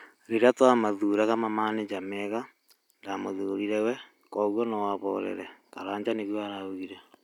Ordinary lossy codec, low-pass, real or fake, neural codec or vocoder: none; 19.8 kHz; real; none